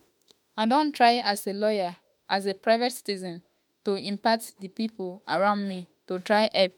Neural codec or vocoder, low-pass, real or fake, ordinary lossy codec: autoencoder, 48 kHz, 32 numbers a frame, DAC-VAE, trained on Japanese speech; 19.8 kHz; fake; MP3, 96 kbps